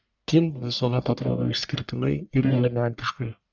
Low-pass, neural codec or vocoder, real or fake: 7.2 kHz; codec, 44.1 kHz, 1.7 kbps, Pupu-Codec; fake